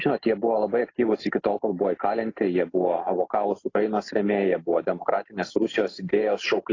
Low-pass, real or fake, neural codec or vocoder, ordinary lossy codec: 7.2 kHz; real; none; AAC, 32 kbps